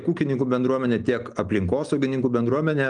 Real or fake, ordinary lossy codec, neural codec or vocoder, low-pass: real; Opus, 32 kbps; none; 9.9 kHz